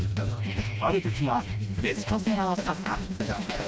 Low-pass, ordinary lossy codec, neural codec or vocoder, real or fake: none; none; codec, 16 kHz, 1 kbps, FreqCodec, smaller model; fake